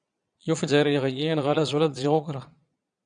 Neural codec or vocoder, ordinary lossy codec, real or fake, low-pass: vocoder, 22.05 kHz, 80 mel bands, Vocos; MP3, 96 kbps; fake; 9.9 kHz